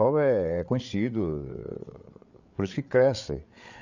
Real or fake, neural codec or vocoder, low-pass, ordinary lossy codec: fake; codec, 16 kHz, 8 kbps, FreqCodec, larger model; 7.2 kHz; none